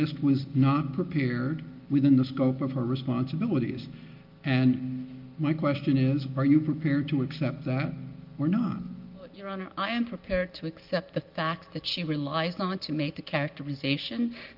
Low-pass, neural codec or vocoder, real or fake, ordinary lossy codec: 5.4 kHz; none; real; Opus, 32 kbps